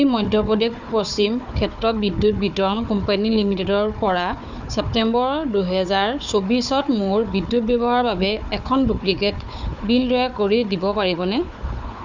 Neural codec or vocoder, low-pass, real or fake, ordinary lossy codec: codec, 16 kHz, 4 kbps, FunCodec, trained on Chinese and English, 50 frames a second; 7.2 kHz; fake; none